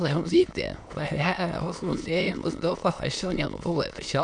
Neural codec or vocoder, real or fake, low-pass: autoencoder, 22.05 kHz, a latent of 192 numbers a frame, VITS, trained on many speakers; fake; 9.9 kHz